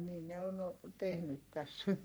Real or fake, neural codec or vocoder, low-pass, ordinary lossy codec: fake; codec, 44.1 kHz, 3.4 kbps, Pupu-Codec; none; none